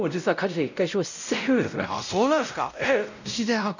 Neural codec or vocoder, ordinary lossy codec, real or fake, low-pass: codec, 16 kHz, 0.5 kbps, X-Codec, WavLM features, trained on Multilingual LibriSpeech; none; fake; 7.2 kHz